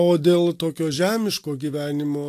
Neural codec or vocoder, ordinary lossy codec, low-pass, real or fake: none; AAC, 64 kbps; 14.4 kHz; real